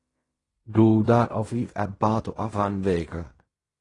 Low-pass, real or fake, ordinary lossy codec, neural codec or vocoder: 10.8 kHz; fake; AAC, 32 kbps; codec, 16 kHz in and 24 kHz out, 0.4 kbps, LongCat-Audio-Codec, fine tuned four codebook decoder